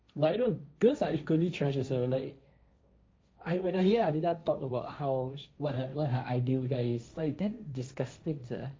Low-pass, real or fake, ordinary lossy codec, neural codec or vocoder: none; fake; none; codec, 16 kHz, 1.1 kbps, Voila-Tokenizer